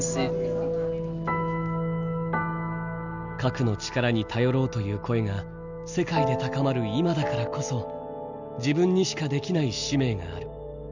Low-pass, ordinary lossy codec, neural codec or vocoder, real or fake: 7.2 kHz; none; none; real